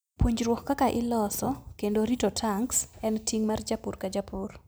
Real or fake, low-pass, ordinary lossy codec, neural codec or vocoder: real; none; none; none